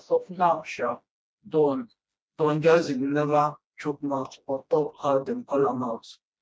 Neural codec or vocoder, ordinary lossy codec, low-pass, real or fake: codec, 16 kHz, 1 kbps, FreqCodec, smaller model; none; none; fake